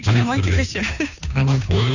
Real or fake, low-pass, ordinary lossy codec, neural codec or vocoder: fake; 7.2 kHz; none; codec, 16 kHz, 2 kbps, FunCodec, trained on Chinese and English, 25 frames a second